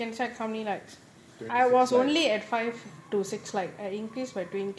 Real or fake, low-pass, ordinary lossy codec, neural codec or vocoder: real; none; none; none